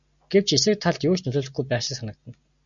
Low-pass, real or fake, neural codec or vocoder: 7.2 kHz; real; none